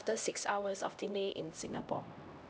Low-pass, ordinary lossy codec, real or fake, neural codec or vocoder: none; none; fake; codec, 16 kHz, 1 kbps, X-Codec, HuBERT features, trained on LibriSpeech